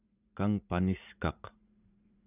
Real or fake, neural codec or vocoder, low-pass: fake; vocoder, 44.1 kHz, 80 mel bands, Vocos; 3.6 kHz